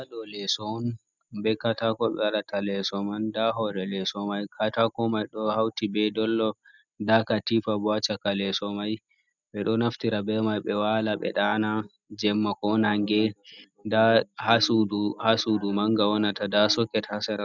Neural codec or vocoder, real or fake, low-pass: none; real; 7.2 kHz